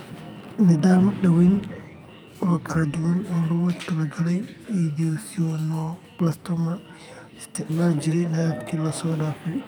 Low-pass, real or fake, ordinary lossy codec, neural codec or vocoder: none; fake; none; codec, 44.1 kHz, 2.6 kbps, SNAC